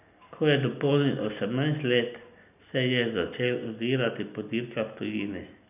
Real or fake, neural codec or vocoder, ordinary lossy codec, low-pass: real; none; none; 3.6 kHz